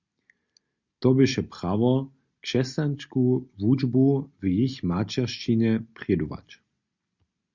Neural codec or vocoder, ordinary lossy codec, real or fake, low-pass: none; Opus, 64 kbps; real; 7.2 kHz